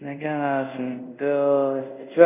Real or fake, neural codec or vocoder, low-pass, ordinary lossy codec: fake; codec, 24 kHz, 0.5 kbps, DualCodec; 3.6 kHz; none